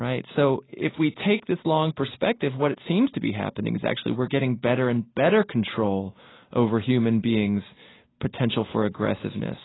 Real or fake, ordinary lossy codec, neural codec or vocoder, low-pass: real; AAC, 16 kbps; none; 7.2 kHz